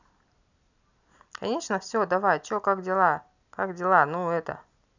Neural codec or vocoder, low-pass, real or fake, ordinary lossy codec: none; 7.2 kHz; real; none